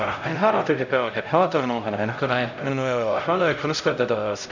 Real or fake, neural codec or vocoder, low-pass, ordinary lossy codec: fake; codec, 16 kHz, 0.5 kbps, X-Codec, HuBERT features, trained on LibriSpeech; 7.2 kHz; none